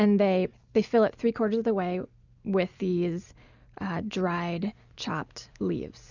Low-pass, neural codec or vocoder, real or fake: 7.2 kHz; none; real